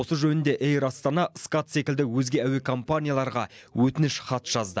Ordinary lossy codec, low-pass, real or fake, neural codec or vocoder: none; none; real; none